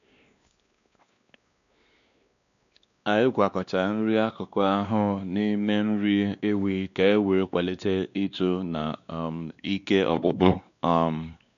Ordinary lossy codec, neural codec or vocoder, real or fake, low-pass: none; codec, 16 kHz, 2 kbps, X-Codec, WavLM features, trained on Multilingual LibriSpeech; fake; 7.2 kHz